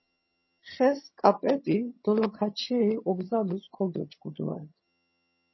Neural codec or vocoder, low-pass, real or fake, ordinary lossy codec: vocoder, 22.05 kHz, 80 mel bands, HiFi-GAN; 7.2 kHz; fake; MP3, 24 kbps